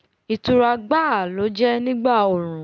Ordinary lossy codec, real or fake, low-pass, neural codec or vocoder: none; real; none; none